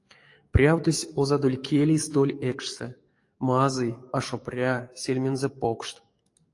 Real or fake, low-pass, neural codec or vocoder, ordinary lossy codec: fake; 10.8 kHz; codec, 44.1 kHz, 7.8 kbps, DAC; AAC, 48 kbps